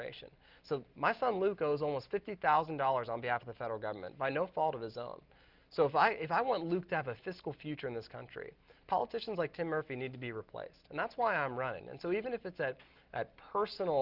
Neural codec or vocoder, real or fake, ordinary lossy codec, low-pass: none; real; Opus, 32 kbps; 5.4 kHz